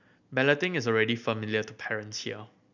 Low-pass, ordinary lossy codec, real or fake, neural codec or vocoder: 7.2 kHz; none; real; none